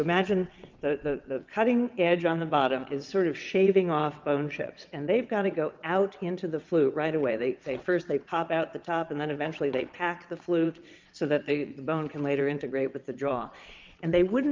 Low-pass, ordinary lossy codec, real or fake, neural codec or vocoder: 7.2 kHz; Opus, 24 kbps; fake; vocoder, 22.05 kHz, 80 mel bands, WaveNeXt